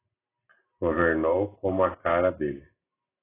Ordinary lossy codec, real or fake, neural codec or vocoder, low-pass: AAC, 16 kbps; real; none; 3.6 kHz